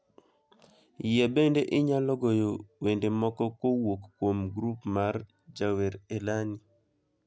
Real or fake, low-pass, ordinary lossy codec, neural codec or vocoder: real; none; none; none